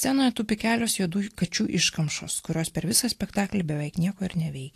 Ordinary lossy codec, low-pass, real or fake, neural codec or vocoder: AAC, 64 kbps; 14.4 kHz; real; none